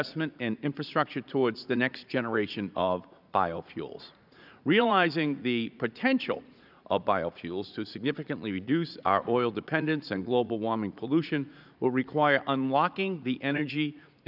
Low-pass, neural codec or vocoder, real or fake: 5.4 kHz; vocoder, 44.1 kHz, 80 mel bands, Vocos; fake